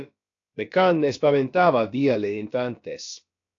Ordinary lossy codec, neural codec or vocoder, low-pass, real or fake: AAC, 48 kbps; codec, 16 kHz, about 1 kbps, DyCAST, with the encoder's durations; 7.2 kHz; fake